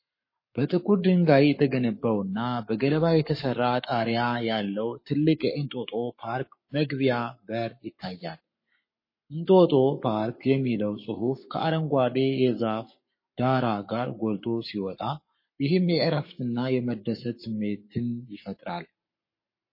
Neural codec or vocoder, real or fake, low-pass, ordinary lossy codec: codec, 44.1 kHz, 7.8 kbps, Pupu-Codec; fake; 5.4 kHz; MP3, 24 kbps